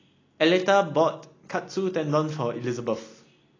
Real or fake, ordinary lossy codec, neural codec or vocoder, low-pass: real; AAC, 32 kbps; none; 7.2 kHz